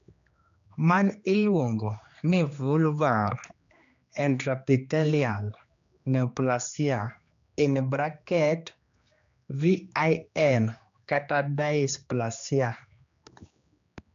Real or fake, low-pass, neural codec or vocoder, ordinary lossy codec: fake; 7.2 kHz; codec, 16 kHz, 2 kbps, X-Codec, HuBERT features, trained on general audio; AAC, 64 kbps